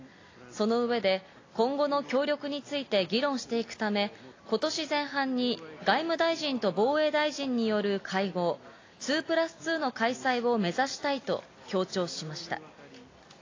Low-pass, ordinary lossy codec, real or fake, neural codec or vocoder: 7.2 kHz; AAC, 32 kbps; real; none